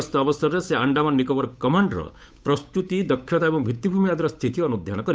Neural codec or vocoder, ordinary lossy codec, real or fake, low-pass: codec, 16 kHz, 8 kbps, FunCodec, trained on Chinese and English, 25 frames a second; none; fake; none